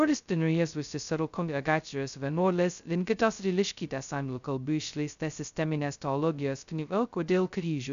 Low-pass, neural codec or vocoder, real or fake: 7.2 kHz; codec, 16 kHz, 0.2 kbps, FocalCodec; fake